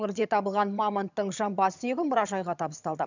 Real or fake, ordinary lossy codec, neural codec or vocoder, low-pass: fake; none; vocoder, 22.05 kHz, 80 mel bands, HiFi-GAN; 7.2 kHz